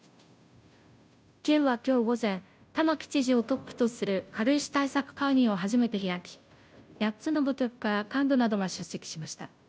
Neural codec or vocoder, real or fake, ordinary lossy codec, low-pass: codec, 16 kHz, 0.5 kbps, FunCodec, trained on Chinese and English, 25 frames a second; fake; none; none